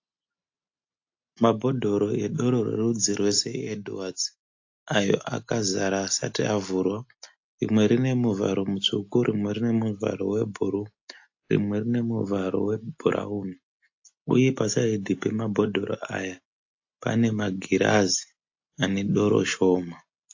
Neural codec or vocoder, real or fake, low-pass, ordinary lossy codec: none; real; 7.2 kHz; AAC, 48 kbps